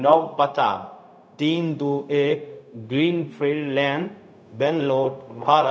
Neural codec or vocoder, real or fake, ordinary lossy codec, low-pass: codec, 16 kHz, 0.4 kbps, LongCat-Audio-Codec; fake; none; none